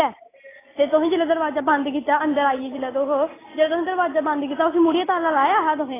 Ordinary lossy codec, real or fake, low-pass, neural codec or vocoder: AAC, 16 kbps; real; 3.6 kHz; none